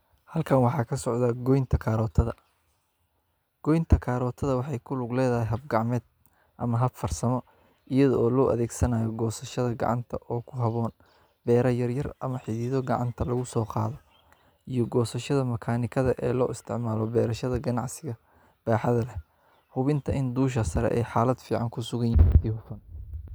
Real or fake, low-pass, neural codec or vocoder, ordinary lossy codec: real; none; none; none